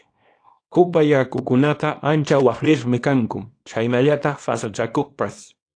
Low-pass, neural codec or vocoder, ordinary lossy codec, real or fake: 9.9 kHz; codec, 24 kHz, 0.9 kbps, WavTokenizer, small release; AAC, 48 kbps; fake